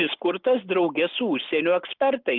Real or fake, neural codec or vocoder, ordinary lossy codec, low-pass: real; none; Opus, 32 kbps; 5.4 kHz